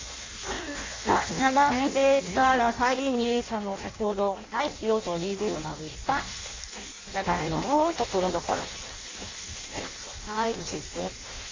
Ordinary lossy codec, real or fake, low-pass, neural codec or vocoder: AAC, 32 kbps; fake; 7.2 kHz; codec, 16 kHz in and 24 kHz out, 0.6 kbps, FireRedTTS-2 codec